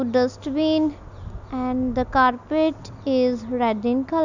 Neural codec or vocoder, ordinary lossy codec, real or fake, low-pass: none; none; real; 7.2 kHz